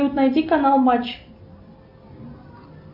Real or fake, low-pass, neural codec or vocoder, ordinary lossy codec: real; 5.4 kHz; none; AAC, 48 kbps